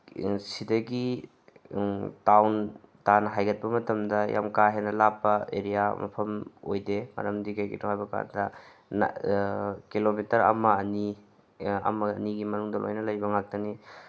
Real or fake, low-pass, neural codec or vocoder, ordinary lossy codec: real; none; none; none